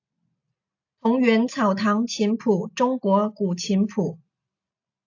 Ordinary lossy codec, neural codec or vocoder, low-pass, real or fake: AAC, 48 kbps; none; 7.2 kHz; real